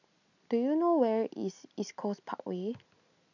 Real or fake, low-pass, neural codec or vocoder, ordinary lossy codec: real; 7.2 kHz; none; none